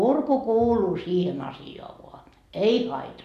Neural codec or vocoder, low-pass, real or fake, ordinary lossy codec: none; 14.4 kHz; real; none